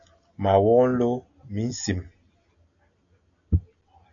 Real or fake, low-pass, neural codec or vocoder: real; 7.2 kHz; none